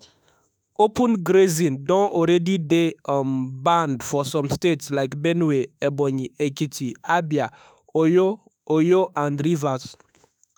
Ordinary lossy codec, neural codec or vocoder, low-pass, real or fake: none; autoencoder, 48 kHz, 32 numbers a frame, DAC-VAE, trained on Japanese speech; none; fake